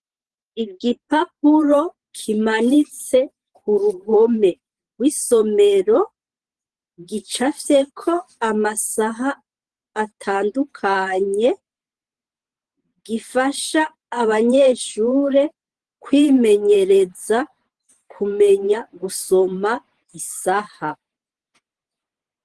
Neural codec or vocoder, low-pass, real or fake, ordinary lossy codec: vocoder, 44.1 kHz, 128 mel bands every 512 samples, BigVGAN v2; 10.8 kHz; fake; Opus, 16 kbps